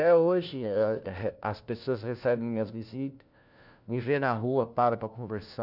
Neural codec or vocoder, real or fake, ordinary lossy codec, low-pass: codec, 16 kHz, 1 kbps, FunCodec, trained on LibriTTS, 50 frames a second; fake; none; 5.4 kHz